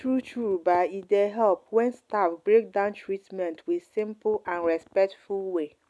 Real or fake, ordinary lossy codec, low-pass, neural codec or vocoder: real; none; none; none